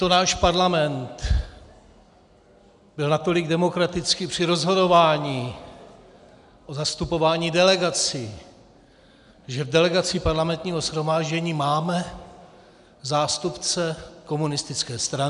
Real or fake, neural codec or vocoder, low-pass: real; none; 10.8 kHz